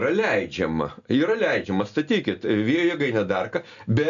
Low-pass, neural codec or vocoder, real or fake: 7.2 kHz; none; real